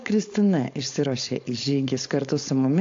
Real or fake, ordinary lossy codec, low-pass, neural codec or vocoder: fake; AAC, 48 kbps; 7.2 kHz; codec, 16 kHz, 4.8 kbps, FACodec